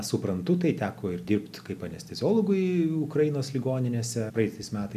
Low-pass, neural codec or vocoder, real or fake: 14.4 kHz; none; real